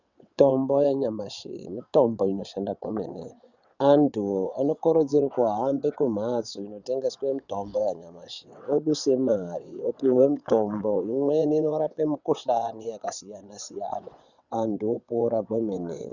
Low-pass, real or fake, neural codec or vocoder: 7.2 kHz; fake; vocoder, 22.05 kHz, 80 mel bands, WaveNeXt